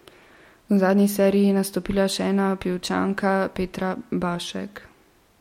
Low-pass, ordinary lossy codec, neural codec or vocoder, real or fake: 19.8 kHz; MP3, 64 kbps; none; real